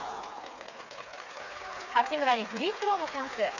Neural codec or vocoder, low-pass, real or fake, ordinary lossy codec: codec, 16 kHz, 4 kbps, FreqCodec, smaller model; 7.2 kHz; fake; none